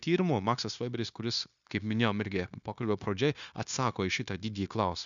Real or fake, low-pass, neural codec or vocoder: fake; 7.2 kHz; codec, 16 kHz, 0.9 kbps, LongCat-Audio-Codec